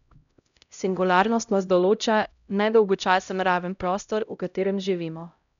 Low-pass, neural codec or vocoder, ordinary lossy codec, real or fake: 7.2 kHz; codec, 16 kHz, 0.5 kbps, X-Codec, HuBERT features, trained on LibriSpeech; none; fake